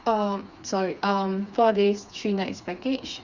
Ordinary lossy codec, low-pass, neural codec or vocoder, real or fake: Opus, 64 kbps; 7.2 kHz; codec, 16 kHz, 4 kbps, FreqCodec, smaller model; fake